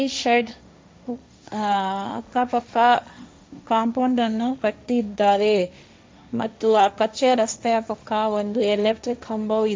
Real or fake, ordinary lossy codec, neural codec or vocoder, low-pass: fake; none; codec, 16 kHz, 1.1 kbps, Voila-Tokenizer; none